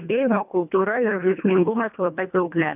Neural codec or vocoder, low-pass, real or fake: codec, 24 kHz, 1.5 kbps, HILCodec; 3.6 kHz; fake